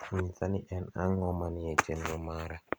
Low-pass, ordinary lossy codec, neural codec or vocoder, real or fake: none; none; vocoder, 44.1 kHz, 128 mel bands every 256 samples, BigVGAN v2; fake